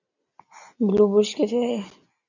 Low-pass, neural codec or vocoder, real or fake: 7.2 kHz; none; real